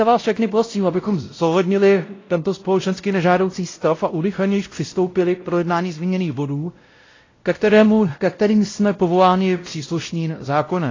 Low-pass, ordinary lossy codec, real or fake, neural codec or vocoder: 7.2 kHz; AAC, 32 kbps; fake; codec, 16 kHz, 0.5 kbps, X-Codec, WavLM features, trained on Multilingual LibriSpeech